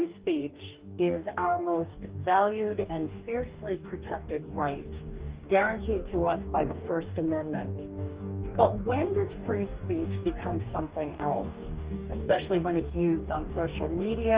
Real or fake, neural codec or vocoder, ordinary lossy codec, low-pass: fake; codec, 44.1 kHz, 2.6 kbps, DAC; Opus, 32 kbps; 3.6 kHz